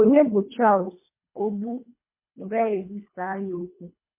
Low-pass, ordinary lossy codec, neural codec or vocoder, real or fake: 3.6 kHz; MP3, 24 kbps; codec, 24 kHz, 1.5 kbps, HILCodec; fake